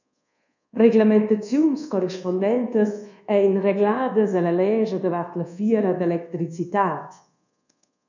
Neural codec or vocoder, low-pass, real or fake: codec, 24 kHz, 1.2 kbps, DualCodec; 7.2 kHz; fake